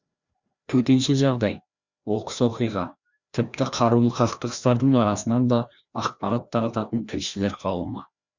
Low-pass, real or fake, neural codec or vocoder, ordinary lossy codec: 7.2 kHz; fake; codec, 16 kHz, 1 kbps, FreqCodec, larger model; Opus, 64 kbps